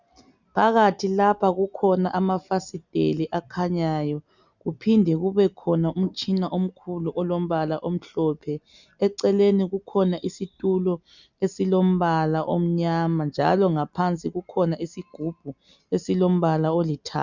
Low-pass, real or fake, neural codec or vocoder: 7.2 kHz; real; none